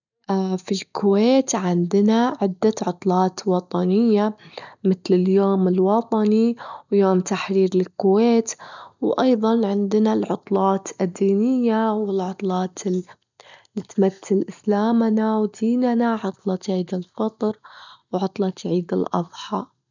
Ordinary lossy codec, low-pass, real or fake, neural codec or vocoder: none; 7.2 kHz; real; none